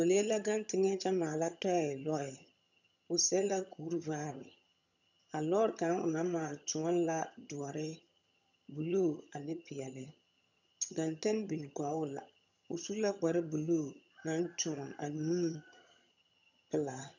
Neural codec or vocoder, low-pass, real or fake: vocoder, 22.05 kHz, 80 mel bands, HiFi-GAN; 7.2 kHz; fake